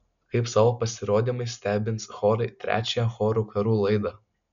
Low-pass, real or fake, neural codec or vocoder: 7.2 kHz; real; none